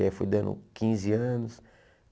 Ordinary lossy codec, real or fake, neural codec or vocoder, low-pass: none; real; none; none